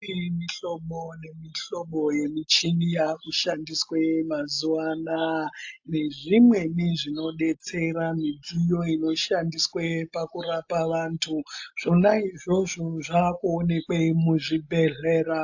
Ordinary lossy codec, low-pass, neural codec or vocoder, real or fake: AAC, 48 kbps; 7.2 kHz; none; real